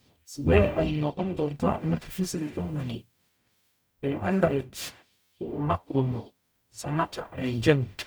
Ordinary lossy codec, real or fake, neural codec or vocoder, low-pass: none; fake; codec, 44.1 kHz, 0.9 kbps, DAC; none